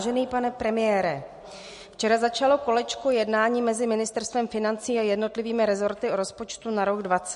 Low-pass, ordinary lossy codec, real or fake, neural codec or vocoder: 14.4 kHz; MP3, 48 kbps; real; none